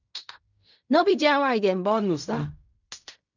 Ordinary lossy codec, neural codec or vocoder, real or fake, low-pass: none; codec, 16 kHz in and 24 kHz out, 0.4 kbps, LongCat-Audio-Codec, fine tuned four codebook decoder; fake; 7.2 kHz